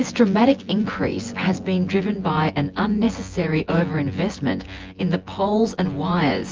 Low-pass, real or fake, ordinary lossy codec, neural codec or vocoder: 7.2 kHz; fake; Opus, 24 kbps; vocoder, 24 kHz, 100 mel bands, Vocos